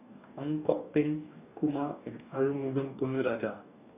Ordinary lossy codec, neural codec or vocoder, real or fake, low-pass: none; codec, 44.1 kHz, 2.6 kbps, DAC; fake; 3.6 kHz